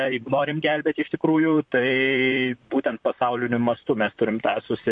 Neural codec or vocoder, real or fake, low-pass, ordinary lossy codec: vocoder, 44.1 kHz, 128 mel bands, Pupu-Vocoder; fake; 9.9 kHz; MP3, 48 kbps